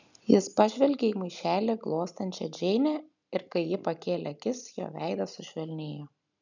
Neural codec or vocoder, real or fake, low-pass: none; real; 7.2 kHz